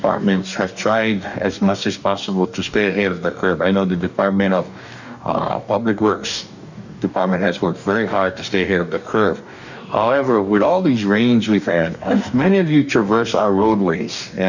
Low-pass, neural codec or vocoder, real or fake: 7.2 kHz; codec, 44.1 kHz, 2.6 kbps, DAC; fake